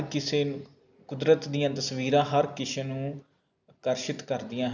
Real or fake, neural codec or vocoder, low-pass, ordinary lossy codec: real; none; 7.2 kHz; none